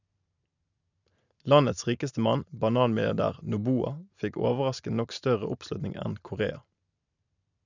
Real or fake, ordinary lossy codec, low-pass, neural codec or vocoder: real; none; 7.2 kHz; none